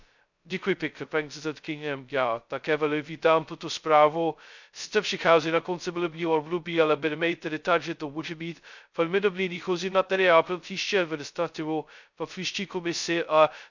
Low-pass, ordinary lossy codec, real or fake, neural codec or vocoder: 7.2 kHz; none; fake; codec, 16 kHz, 0.2 kbps, FocalCodec